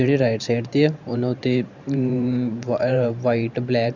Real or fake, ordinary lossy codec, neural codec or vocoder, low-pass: fake; none; vocoder, 44.1 kHz, 128 mel bands every 512 samples, BigVGAN v2; 7.2 kHz